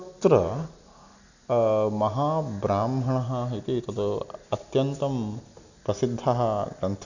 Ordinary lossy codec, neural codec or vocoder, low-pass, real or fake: none; none; 7.2 kHz; real